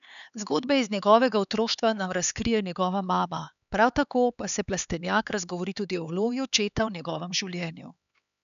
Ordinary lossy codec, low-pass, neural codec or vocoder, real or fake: none; 7.2 kHz; codec, 16 kHz, 4 kbps, X-Codec, HuBERT features, trained on LibriSpeech; fake